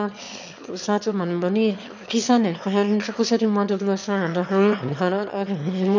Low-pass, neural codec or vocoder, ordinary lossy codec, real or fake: 7.2 kHz; autoencoder, 22.05 kHz, a latent of 192 numbers a frame, VITS, trained on one speaker; none; fake